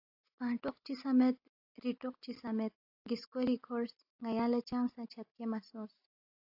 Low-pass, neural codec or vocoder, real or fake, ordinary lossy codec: 5.4 kHz; none; real; AAC, 48 kbps